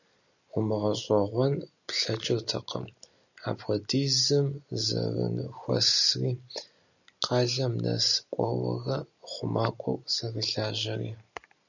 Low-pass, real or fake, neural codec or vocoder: 7.2 kHz; real; none